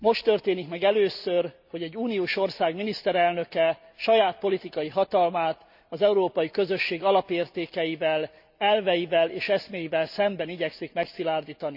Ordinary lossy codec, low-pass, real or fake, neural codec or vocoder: none; 5.4 kHz; real; none